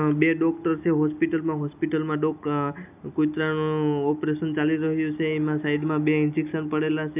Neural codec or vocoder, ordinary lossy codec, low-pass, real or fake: none; none; 3.6 kHz; real